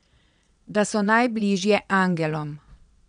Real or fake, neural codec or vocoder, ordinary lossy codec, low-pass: fake; vocoder, 22.05 kHz, 80 mel bands, Vocos; none; 9.9 kHz